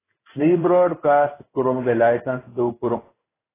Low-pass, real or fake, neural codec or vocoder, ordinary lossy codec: 3.6 kHz; fake; codec, 16 kHz in and 24 kHz out, 1 kbps, XY-Tokenizer; AAC, 16 kbps